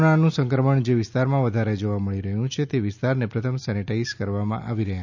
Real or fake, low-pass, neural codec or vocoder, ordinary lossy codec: real; 7.2 kHz; none; none